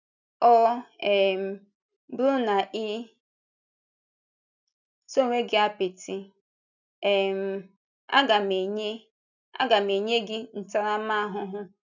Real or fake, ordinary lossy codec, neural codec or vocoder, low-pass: real; none; none; 7.2 kHz